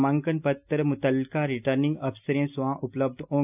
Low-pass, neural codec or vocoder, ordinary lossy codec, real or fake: 3.6 kHz; none; AAC, 32 kbps; real